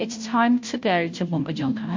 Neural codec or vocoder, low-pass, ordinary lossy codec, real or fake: codec, 16 kHz, 0.5 kbps, FunCodec, trained on Chinese and English, 25 frames a second; 7.2 kHz; MP3, 48 kbps; fake